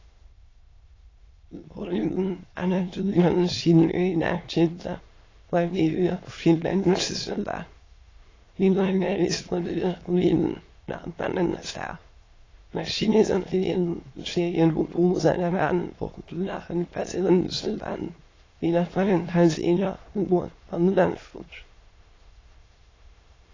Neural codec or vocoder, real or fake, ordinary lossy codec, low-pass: autoencoder, 22.05 kHz, a latent of 192 numbers a frame, VITS, trained on many speakers; fake; AAC, 32 kbps; 7.2 kHz